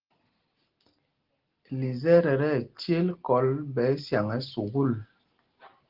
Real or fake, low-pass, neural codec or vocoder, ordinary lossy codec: real; 5.4 kHz; none; Opus, 16 kbps